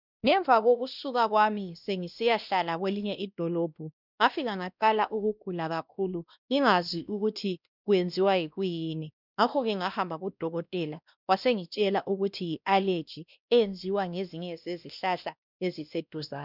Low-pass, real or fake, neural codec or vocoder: 5.4 kHz; fake; codec, 16 kHz, 1 kbps, X-Codec, WavLM features, trained on Multilingual LibriSpeech